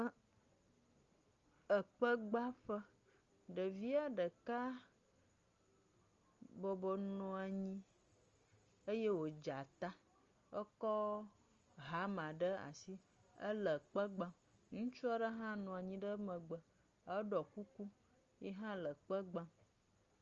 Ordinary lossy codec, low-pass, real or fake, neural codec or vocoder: Opus, 32 kbps; 7.2 kHz; real; none